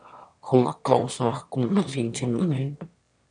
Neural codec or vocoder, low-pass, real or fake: autoencoder, 22.05 kHz, a latent of 192 numbers a frame, VITS, trained on one speaker; 9.9 kHz; fake